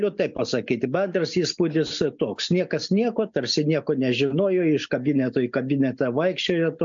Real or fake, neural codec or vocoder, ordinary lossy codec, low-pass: real; none; MP3, 64 kbps; 7.2 kHz